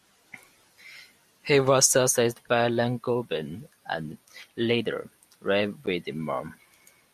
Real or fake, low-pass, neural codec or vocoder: real; 14.4 kHz; none